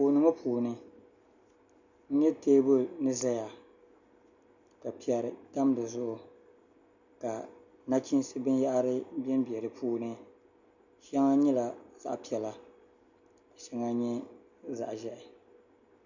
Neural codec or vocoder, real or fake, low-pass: none; real; 7.2 kHz